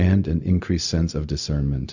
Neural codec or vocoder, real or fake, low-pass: codec, 16 kHz, 0.4 kbps, LongCat-Audio-Codec; fake; 7.2 kHz